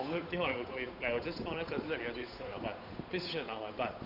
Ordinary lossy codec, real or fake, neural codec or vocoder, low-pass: AAC, 48 kbps; fake; codec, 16 kHz, 8 kbps, FunCodec, trained on Chinese and English, 25 frames a second; 5.4 kHz